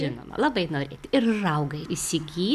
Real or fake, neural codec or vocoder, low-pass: real; none; 14.4 kHz